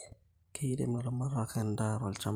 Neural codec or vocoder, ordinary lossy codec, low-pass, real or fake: vocoder, 44.1 kHz, 128 mel bands every 512 samples, BigVGAN v2; none; none; fake